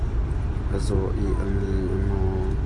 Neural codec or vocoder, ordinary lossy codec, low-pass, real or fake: none; AAC, 48 kbps; 10.8 kHz; real